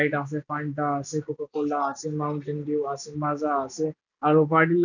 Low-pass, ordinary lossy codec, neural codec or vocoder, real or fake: 7.2 kHz; none; none; real